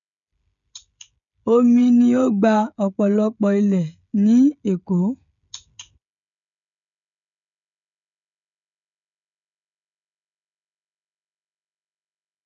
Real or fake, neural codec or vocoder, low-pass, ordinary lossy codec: fake; codec, 16 kHz, 16 kbps, FreqCodec, smaller model; 7.2 kHz; none